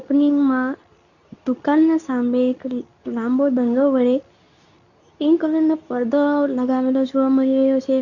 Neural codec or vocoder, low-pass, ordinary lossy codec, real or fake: codec, 24 kHz, 0.9 kbps, WavTokenizer, medium speech release version 2; 7.2 kHz; none; fake